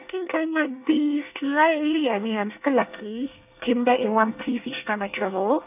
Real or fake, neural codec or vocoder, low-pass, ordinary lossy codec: fake; codec, 24 kHz, 1 kbps, SNAC; 3.6 kHz; none